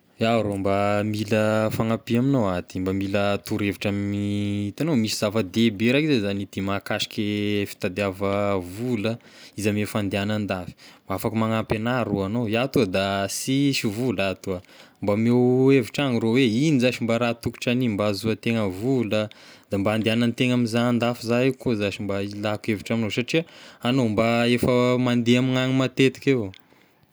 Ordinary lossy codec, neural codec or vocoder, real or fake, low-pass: none; none; real; none